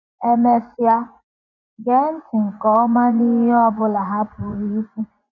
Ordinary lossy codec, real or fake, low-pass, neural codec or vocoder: none; fake; 7.2 kHz; vocoder, 24 kHz, 100 mel bands, Vocos